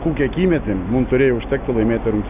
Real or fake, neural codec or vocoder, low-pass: real; none; 3.6 kHz